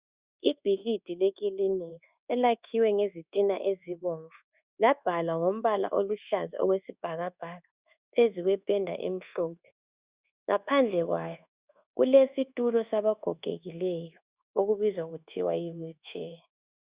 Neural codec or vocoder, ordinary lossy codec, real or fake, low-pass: codec, 24 kHz, 1.2 kbps, DualCodec; Opus, 64 kbps; fake; 3.6 kHz